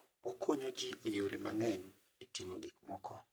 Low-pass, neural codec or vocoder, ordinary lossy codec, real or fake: none; codec, 44.1 kHz, 3.4 kbps, Pupu-Codec; none; fake